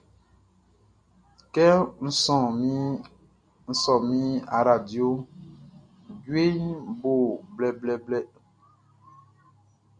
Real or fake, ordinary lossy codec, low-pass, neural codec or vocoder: real; AAC, 64 kbps; 9.9 kHz; none